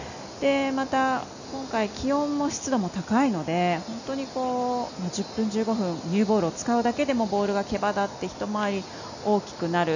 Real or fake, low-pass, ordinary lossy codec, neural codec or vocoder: real; 7.2 kHz; none; none